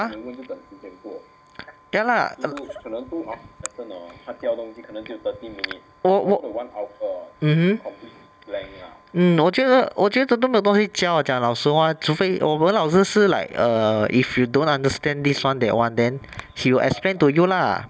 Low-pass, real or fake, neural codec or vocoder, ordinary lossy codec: none; real; none; none